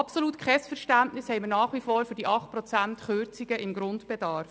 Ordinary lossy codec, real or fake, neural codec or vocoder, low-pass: none; real; none; none